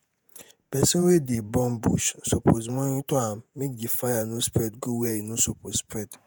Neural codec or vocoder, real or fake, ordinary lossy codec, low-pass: vocoder, 48 kHz, 128 mel bands, Vocos; fake; none; none